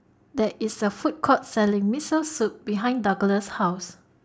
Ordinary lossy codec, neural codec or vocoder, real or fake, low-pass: none; none; real; none